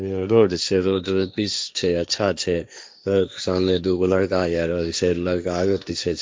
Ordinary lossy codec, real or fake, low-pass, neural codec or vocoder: none; fake; none; codec, 16 kHz, 1.1 kbps, Voila-Tokenizer